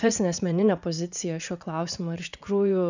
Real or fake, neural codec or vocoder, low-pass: fake; vocoder, 44.1 kHz, 80 mel bands, Vocos; 7.2 kHz